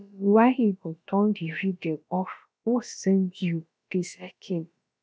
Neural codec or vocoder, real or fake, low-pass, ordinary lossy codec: codec, 16 kHz, about 1 kbps, DyCAST, with the encoder's durations; fake; none; none